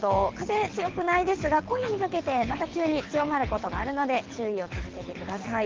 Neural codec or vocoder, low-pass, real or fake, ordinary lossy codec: codec, 24 kHz, 6 kbps, HILCodec; 7.2 kHz; fake; Opus, 16 kbps